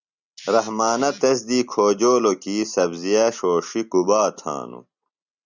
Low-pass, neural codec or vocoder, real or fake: 7.2 kHz; none; real